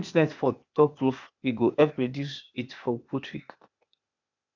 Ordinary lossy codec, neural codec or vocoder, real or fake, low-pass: none; codec, 16 kHz, 0.8 kbps, ZipCodec; fake; 7.2 kHz